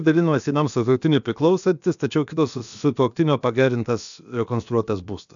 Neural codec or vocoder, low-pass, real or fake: codec, 16 kHz, about 1 kbps, DyCAST, with the encoder's durations; 7.2 kHz; fake